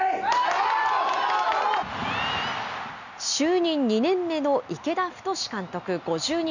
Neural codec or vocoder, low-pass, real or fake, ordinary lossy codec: none; 7.2 kHz; real; none